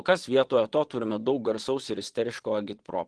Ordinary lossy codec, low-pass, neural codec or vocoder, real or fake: Opus, 16 kbps; 9.9 kHz; vocoder, 22.05 kHz, 80 mel bands, Vocos; fake